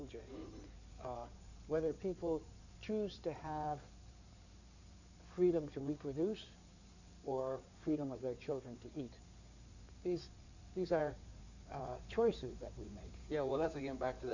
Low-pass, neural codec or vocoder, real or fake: 7.2 kHz; codec, 16 kHz in and 24 kHz out, 2.2 kbps, FireRedTTS-2 codec; fake